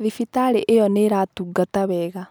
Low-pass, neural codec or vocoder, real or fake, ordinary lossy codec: none; none; real; none